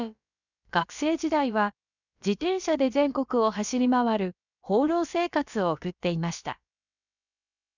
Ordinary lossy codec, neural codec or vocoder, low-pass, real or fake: none; codec, 16 kHz, about 1 kbps, DyCAST, with the encoder's durations; 7.2 kHz; fake